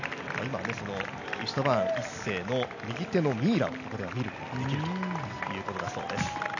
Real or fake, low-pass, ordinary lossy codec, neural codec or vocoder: real; 7.2 kHz; none; none